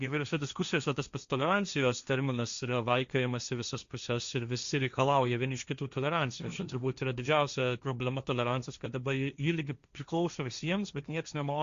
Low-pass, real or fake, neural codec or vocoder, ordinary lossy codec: 7.2 kHz; fake; codec, 16 kHz, 1.1 kbps, Voila-Tokenizer; Opus, 64 kbps